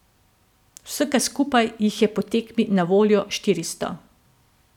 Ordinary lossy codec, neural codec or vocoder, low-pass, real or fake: none; none; 19.8 kHz; real